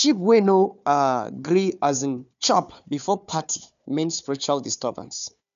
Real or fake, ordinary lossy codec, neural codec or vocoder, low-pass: fake; none; codec, 16 kHz, 4 kbps, FunCodec, trained on Chinese and English, 50 frames a second; 7.2 kHz